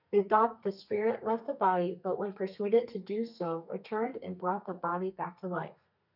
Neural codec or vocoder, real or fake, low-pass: codec, 32 kHz, 1.9 kbps, SNAC; fake; 5.4 kHz